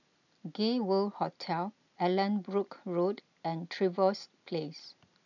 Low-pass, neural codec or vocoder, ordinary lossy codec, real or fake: 7.2 kHz; none; none; real